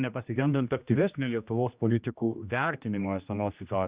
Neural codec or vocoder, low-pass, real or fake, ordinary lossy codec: codec, 16 kHz, 1 kbps, X-Codec, HuBERT features, trained on general audio; 3.6 kHz; fake; Opus, 64 kbps